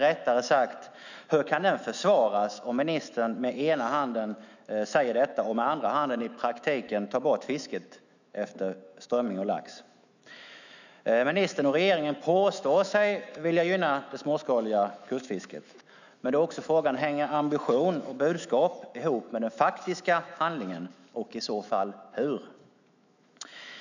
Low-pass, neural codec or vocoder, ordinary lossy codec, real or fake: 7.2 kHz; autoencoder, 48 kHz, 128 numbers a frame, DAC-VAE, trained on Japanese speech; none; fake